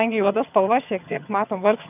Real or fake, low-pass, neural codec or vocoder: fake; 3.6 kHz; vocoder, 22.05 kHz, 80 mel bands, HiFi-GAN